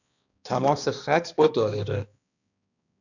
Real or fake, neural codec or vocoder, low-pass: fake; codec, 16 kHz, 2 kbps, X-Codec, HuBERT features, trained on balanced general audio; 7.2 kHz